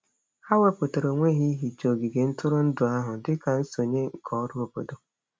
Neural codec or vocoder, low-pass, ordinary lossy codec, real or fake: none; none; none; real